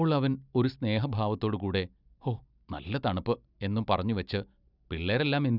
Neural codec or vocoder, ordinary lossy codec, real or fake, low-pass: none; none; real; 5.4 kHz